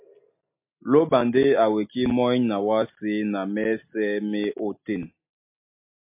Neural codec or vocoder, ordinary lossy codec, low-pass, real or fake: none; MP3, 24 kbps; 3.6 kHz; real